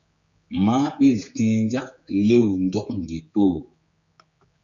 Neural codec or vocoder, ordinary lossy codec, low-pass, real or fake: codec, 16 kHz, 4 kbps, X-Codec, HuBERT features, trained on general audio; Opus, 64 kbps; 7.2 kHz; fake